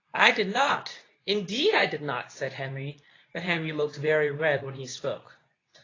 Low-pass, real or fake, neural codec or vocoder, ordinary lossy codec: 7.2 kHz; fake; codec, 24 kHz, 0.9 kbps, WavTokenizer, medium speech release version 2; AAC, 32 kbps